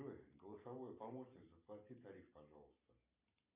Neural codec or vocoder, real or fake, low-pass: vocoder, 44.1 kHz, 128 mel bands every 512 samples, BigVGAN v2; fake; 3.6 kHz